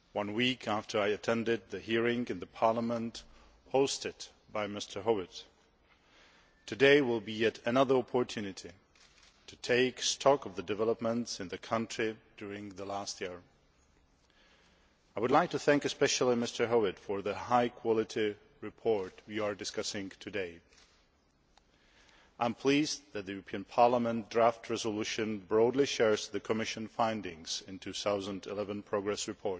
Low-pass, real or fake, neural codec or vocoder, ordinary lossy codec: none; real; none; none